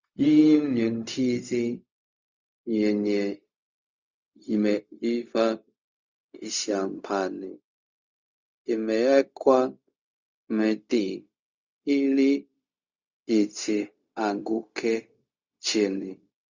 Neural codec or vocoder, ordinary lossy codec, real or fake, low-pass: codec, 16 kHz, 0.4 kbps, LongCat-Audio-Codec; Opus, 64 kbps; fake; 7.2 kHz